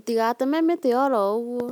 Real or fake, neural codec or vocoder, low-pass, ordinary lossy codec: real; none; 19.8 kHz; none